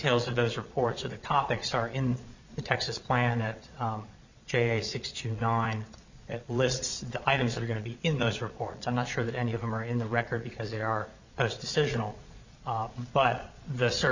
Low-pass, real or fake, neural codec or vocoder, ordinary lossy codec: 7.2 kHz; fake; vocoder, 22.05 kHz, 80 mel bands, Vocos; Opus, 64 kbps